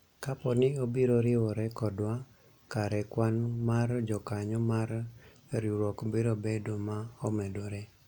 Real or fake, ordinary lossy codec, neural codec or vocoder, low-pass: real; MP3, 96 kbps; none; 19.8 kHz